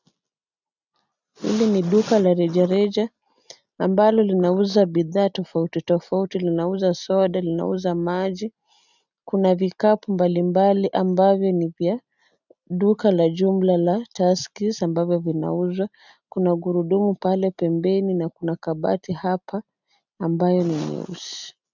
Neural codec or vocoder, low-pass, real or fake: none; 7.2 kHz; real